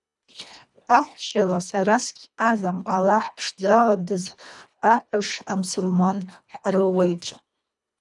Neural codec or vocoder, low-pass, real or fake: codec, 24 kHz, 1.5 kbps, HILCodec; 10.8 kHz; fake